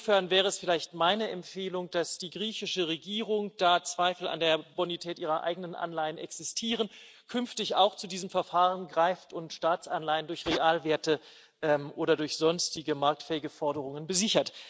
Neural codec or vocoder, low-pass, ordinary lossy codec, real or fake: none; none; none; real